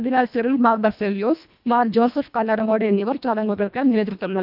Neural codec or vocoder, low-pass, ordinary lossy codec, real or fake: codec, 24 kHz, 1.5 kbps, HILCodec; 5.4 kHz; none; fake